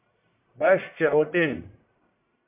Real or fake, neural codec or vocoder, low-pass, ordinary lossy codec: fake; codec, 44.1 kHz, 1.7 kbps, Pupu-Codec; 3.6 kHz; MP3, 32 kbps